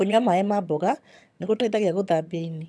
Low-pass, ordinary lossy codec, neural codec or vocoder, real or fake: none; none; vocoder, 22.05 kHz, 80 mel bands, HiFi-GAN; fake